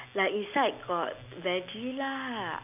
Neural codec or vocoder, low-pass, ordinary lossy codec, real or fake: none; 3.6 kHz; none; real